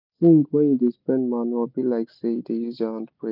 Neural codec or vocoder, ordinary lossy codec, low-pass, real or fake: none; none; 5.4 kHz; real